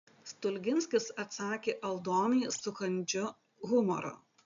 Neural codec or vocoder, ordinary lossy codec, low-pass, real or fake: none; AAC, 96 kbps; 7.2 kHz; real